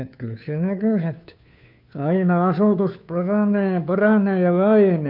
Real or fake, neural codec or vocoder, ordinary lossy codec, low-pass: fake; codec, 16 kHz, 2 kbps, FunCodec, trained on Chinese and English, 25 frames a second; none; 5.4 kHz